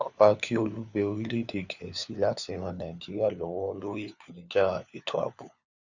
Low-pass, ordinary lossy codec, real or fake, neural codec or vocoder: 7.2 kHz; Opus, 64 kbps; fake; codec, 16 kHz, 4 kbps, FunCodec, trained on LibriTTS, 50 frames a second